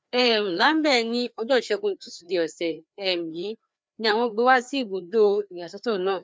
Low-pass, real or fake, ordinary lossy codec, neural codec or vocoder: none; fake; none; codec, 16 kHz, 2 kbps, FreqCodec, larger model